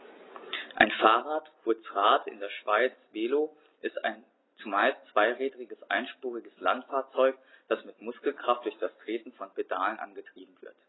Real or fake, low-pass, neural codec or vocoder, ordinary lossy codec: real; 7.2 kHz; none; AAC, 16 kbps